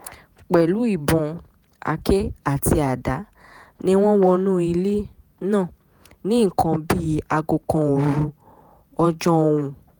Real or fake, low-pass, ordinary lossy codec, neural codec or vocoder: fake; none; none; vocoder, 48 kHz, 128 mel bands, Vocos